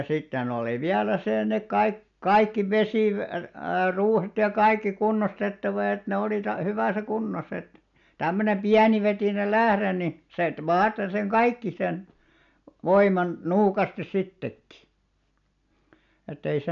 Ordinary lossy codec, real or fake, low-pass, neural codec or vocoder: none; real; 7.2 kHz; none